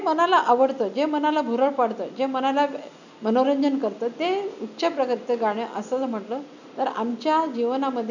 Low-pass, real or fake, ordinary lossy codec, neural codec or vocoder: 7.2 kHz; real; none; none